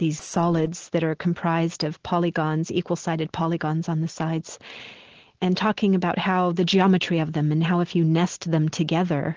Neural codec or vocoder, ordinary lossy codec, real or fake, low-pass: none; Opus, 16 kbps; real; 7.2 kHz